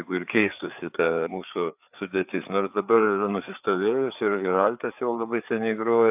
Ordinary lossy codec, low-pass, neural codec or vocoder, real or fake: AAC, 32 kbps; 3.6 kHz; codec, 16 kHz, 4 kbps, FreqCodec, larger model; fake